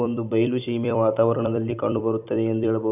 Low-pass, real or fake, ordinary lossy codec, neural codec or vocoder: 3.6 kHz; fake; none; vocoder, 44.1 kHz, 128 mel bands every 256 samples, BigVGAN v2